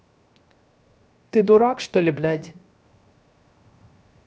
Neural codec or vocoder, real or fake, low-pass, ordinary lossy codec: codec, 16 kHz, 0.7 kbps, FocalCodec; fake; none; none